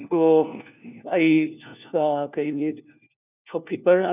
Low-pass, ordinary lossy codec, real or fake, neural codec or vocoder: 3.6 kHz; none; fake; codec, 16 kHz, 1 kbps, FunCodec, trained on LibriTTS, 50 frames a second